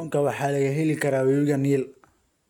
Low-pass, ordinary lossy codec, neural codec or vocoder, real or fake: 19.8 kHz; none; none; real